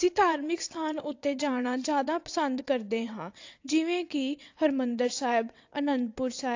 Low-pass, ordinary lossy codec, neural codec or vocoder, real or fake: 7.2 kHz; AAC, 48 kbps; vocoder, 22.05 kHz, 80 mel bands, WaveNeXt; fake